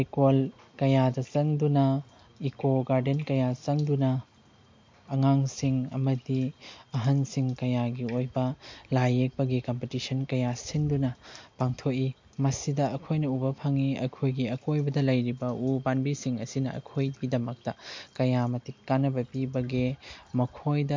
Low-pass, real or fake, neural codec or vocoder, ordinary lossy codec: 7.2 kHz; real; none; MP3, 48 kbps